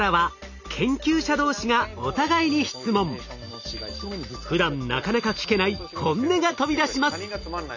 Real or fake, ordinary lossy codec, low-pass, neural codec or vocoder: real; none; 7.2 kHz; none